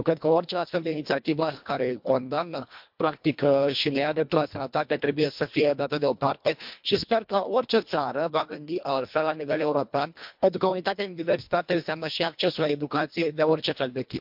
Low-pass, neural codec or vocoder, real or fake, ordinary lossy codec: 5.4 kHz; codec, 24 kHz, 1.5 kbps, HILCodec; fake; MP3, 48 kbps